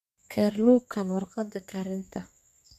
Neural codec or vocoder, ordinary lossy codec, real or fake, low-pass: codec, 32 kHz, 1.9 kbps, SNAC; none; fake; 14.4 kHz